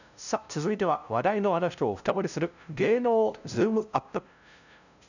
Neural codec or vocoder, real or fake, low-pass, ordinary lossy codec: codec, 16 kHz, 0.5 kbps, FunCodec, trained on LibriTTS, 25 frames a second; fake; 7.2 kHz; none